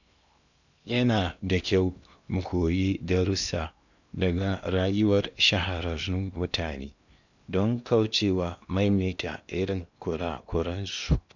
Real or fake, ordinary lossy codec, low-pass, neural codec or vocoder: fake; none; 7.2 kHz; codec, 16 kHz in and 24 kHz out, 0.8 kbps, FocalCodec, streaming, 65536 codes